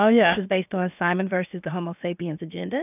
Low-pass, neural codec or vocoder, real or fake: 3.6 kHz; codec, 16 kHz, about 1 kbps, DyCAST, with the encoder's durations; fake